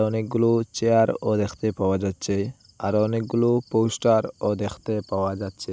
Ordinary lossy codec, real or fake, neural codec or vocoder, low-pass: none; real; none; none